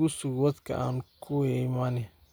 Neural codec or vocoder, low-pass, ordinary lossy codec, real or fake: none; none; none; real